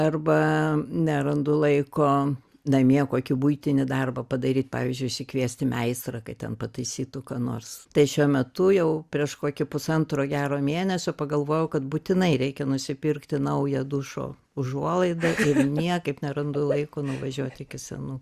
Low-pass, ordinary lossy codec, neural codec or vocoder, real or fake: 14.4 kHz; Opus, 64 kbps; none; real